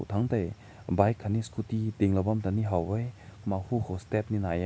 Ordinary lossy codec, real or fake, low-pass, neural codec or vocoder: none; real; none; none